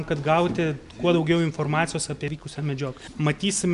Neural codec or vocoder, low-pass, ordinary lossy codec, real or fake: none; 10.8 kHz; MP3, 96 kbps; real